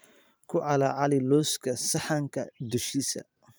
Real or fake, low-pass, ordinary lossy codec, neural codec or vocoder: fake; none; none; vocoder, 44.1 kHz, 128 mel bands every 512 samples, BigVGAN v2